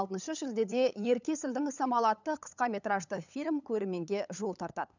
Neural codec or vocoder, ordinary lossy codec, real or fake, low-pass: codec, 16 kHz, 8 kbps, FunCodec, trained on LibriTTS, 25 frames a second; none; fake; 7.2 kHz